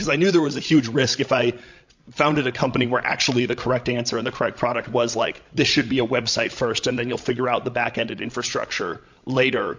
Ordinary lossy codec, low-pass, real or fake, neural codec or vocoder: MP3, 48 kbps; 7.2 kHz; fake; codec, 16 kHz, 16 kbps, FreqCodec, larger model